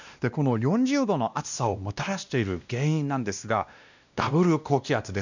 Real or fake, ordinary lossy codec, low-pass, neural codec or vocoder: fake; none; 7.2 kHz; codec, 16 kHz, 1 kbps, X-Codec, WavLM features, trained on Multilingual LibriSpeech